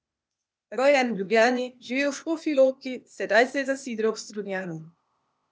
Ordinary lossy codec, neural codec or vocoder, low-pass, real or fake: none; codec, 16 kHz, 0.8 kbps, ZipCodec; none; fake